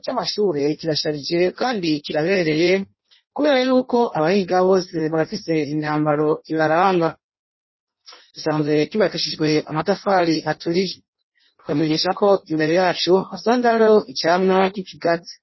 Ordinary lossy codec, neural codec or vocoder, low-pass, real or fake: MP3, 24 kbps; codec, 16 kHz in and 24 kHz out, 0.6 kbps, FireRedTTS-2 codec; 7.2 kHz; fake